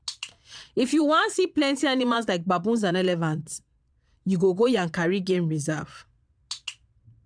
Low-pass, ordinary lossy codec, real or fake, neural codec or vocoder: 9.9 kHz; none; fake; vocoder, 22.05 kHz, 80 mel bands, Vocos